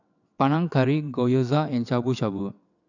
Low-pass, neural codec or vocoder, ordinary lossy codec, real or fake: 7.2 kHz; vocoder, 22.05 kHz, 80 mel bands, WaveNeXt; none; fake